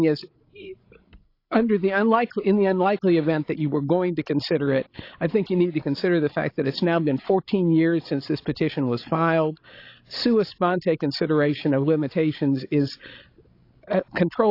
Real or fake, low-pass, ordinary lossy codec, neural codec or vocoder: fake; 5.4 kHz; AAC, 32 kbps; codec, 16 kHz, 16 kbps, FreqCodec, larger model